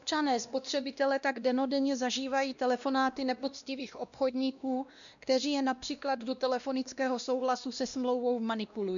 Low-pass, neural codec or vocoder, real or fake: 7.2 kHz; codec, 16 kHz, 1 kbps, X-Codec, WavLM features, trained on Multilingual LibriSpeech; fake